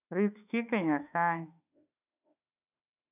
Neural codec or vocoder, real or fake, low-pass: autoencoder, 48 kHz, 32 numbers a frame, DAC-VAE, trained on Japanese speech; fake; 3.6 kHz